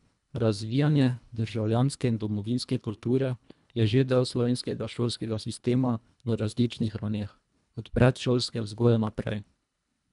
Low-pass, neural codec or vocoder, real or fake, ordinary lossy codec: 10.8 kHz; codec, 24 kHz, 1.5 kbps, HILCodec; fake; none